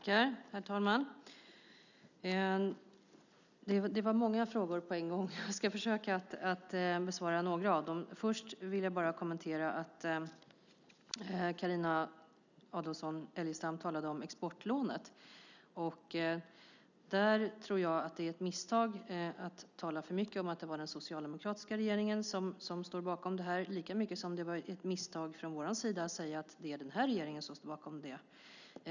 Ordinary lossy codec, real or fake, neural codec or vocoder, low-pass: none; real; none; 7.2 kHz